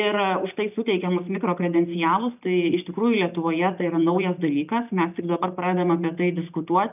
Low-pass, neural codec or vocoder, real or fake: 3.6 kHz; none; real